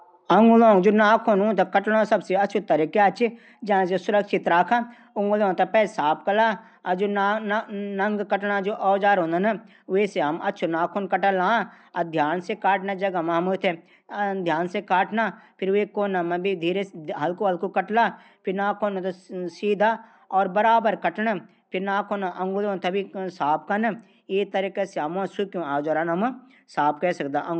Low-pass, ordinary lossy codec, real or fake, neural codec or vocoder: none; none; real; none